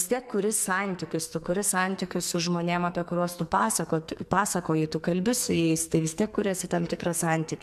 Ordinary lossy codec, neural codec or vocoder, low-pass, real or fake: MP3, 96 kbps; codec, 44.1 kHz, 2.6 kbps, SNAC; 14.4 kHz; fake